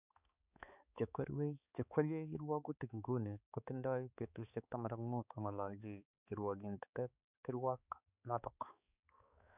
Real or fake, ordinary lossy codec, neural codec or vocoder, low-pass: fake; none; codec, 16 kHz, 4 kbps, X-Codec, HuBERT features, trained on balanced general audio; 3.6 kHz